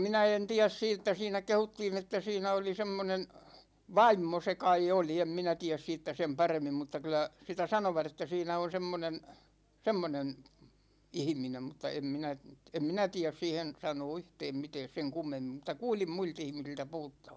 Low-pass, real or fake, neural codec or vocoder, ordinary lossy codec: none; real; none; none